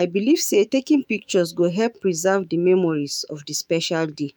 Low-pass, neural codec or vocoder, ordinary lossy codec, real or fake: none; autoencoder, 48 kHz, 128 numbers a frame, DAC-VAE, trained on Japanese speech; none; fake